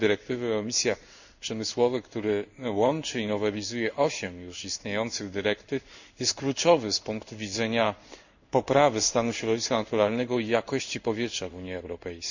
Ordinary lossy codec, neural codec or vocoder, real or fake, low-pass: none; codec, 16 kHz in and 24 kHz out, 1 kbps, XY-Tokenizer; fake; 7.2 kHz